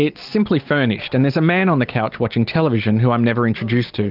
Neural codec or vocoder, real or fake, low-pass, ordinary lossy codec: none; real; 5.4 kHz; Opus, 32 kbps